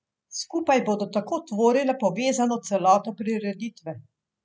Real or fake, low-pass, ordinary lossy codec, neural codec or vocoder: real; none; none; none